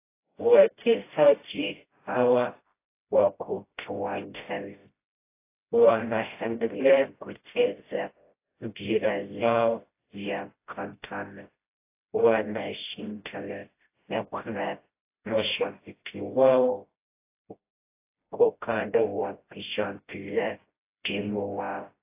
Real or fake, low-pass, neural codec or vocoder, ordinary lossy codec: fake; 3.6 kHz; codec, 16 kHz, 0.5 kbps, FreqCodec, smaller model; AAC, 24 kbps